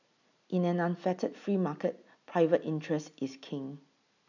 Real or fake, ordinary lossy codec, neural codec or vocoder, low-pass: real; none; none; 7.2 kHz